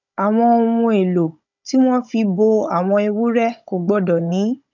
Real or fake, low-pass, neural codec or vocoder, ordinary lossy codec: fake; 7.2 kHz; codec, 16 kHz, 16 kbps, FunCodec, trained on Chinese and English, 50 frames a second; none